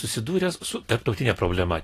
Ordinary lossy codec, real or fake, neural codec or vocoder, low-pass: AAC, 48 kbps; real; none; 14.4 kHz